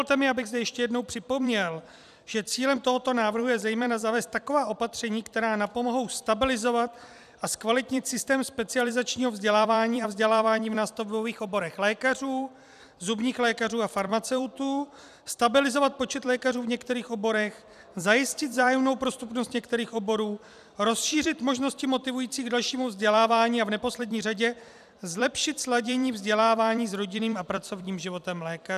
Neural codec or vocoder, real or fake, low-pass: vocoder, 44.1 kHz, 128 mel bands every 256 samples, BigVGAN v2; fake; 14.4 kHz